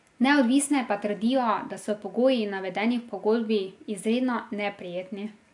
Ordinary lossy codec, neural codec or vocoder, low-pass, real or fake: AAC, 64 kbps; none; 10.8 kHz; real